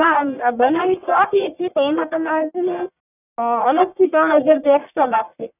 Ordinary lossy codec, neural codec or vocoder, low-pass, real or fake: none; codec, 44.1 kHz, 1.7 kbps, Pupu-Codec; 3.6 kHz; fake